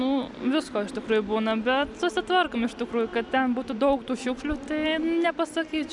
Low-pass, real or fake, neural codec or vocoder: 10.8 kHz; real; none